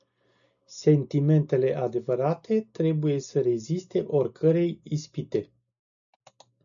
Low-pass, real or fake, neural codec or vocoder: 7.2 kHz; real; none